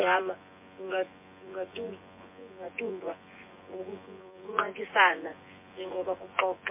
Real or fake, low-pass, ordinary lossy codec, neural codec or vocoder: fake; 3.6 kHz; MP3, 24 kbps; vocoder, 24 kHz, 100 mel bands, Vocos